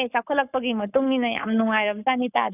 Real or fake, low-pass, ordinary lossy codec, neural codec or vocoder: fake; 3.6 kHz; none; codec, 44.1 kHz, 7.8 kbps, Pupu-Codec